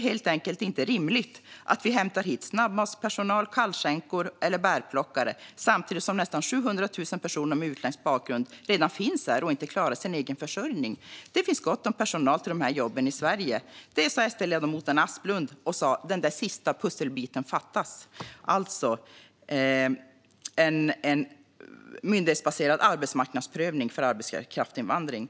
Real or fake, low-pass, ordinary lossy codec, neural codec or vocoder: real; none; none; none